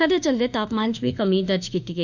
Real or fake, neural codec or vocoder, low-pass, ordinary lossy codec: fake; autoencoder, 48 kHz, 32 numbers a frame, DAC-VAE, trained on Japanese speech; 7.2 kHz; none